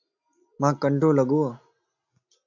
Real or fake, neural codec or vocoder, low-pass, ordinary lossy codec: real; none; 7.2 kHz; AAC, 48 kbps